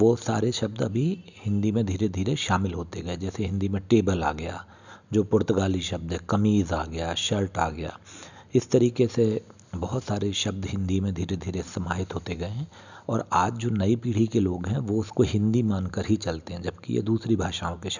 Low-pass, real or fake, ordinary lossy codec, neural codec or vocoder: 7.2 kHz; real; none; none